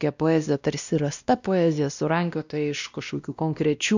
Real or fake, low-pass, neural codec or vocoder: fake; 7.2 kHz; codec, 16 kHz, 1 kbps, X-Codec, WavLM features, trained on Multilingual LibriSpeech